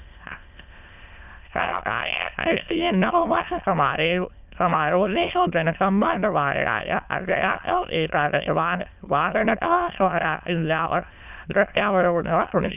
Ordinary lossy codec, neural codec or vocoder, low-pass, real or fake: none; autoencoder, 22.05 kHz, a latent of 192 numbers a frame, VITS, trained on many speakers; 3.6 kHz; fake